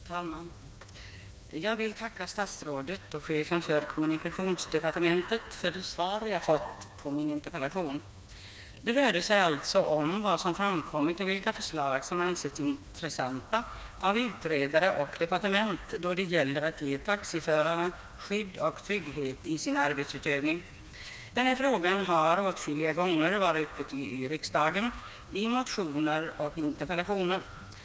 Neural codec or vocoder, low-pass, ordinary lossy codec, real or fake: codec, 16 kHz, 2 kbps, FreqCodec, smaller model; none; none; fake